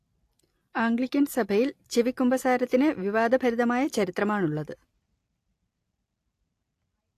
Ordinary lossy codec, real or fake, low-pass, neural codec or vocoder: AAC, 64 kbps; real; 14.4 kHz; none